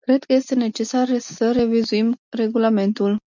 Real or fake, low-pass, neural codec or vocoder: real; 7.2 kHz; none